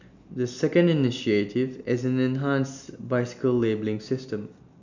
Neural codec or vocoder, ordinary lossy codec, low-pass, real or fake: none; none; 7.2 kHz; real